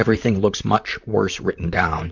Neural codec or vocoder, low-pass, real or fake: vocoder, 44.1 kHz, 128 mel bands, Pupu-Vocoder; 7.2 kHz; fake